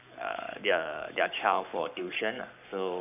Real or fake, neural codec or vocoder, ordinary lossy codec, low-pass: fake; codec, 44.1 kHz, 7.8 kbps, Pupu-Codec; none; 3.6 kHz